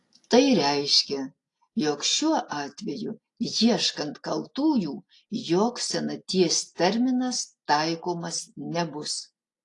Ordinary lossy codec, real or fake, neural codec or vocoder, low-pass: AAC, 48 kbps; real; none; 10.8 kHz